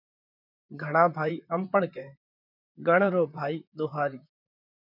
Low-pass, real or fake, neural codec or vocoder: 5.4 kHz; fake; vocoder, 44.1 kHz, 128 mel bands, Pupu-Vocoder